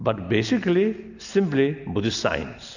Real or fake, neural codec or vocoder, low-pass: real; none; 7.2 kHz